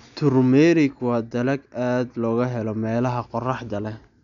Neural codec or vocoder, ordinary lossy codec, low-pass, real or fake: none; none; 7.2 kHz; real